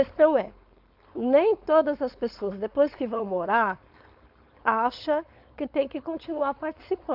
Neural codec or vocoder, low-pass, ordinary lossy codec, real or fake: codec, 16 kHz, 4.8 kbps, FACodec; 5.4 kHz; AAC, 48 kbps; fake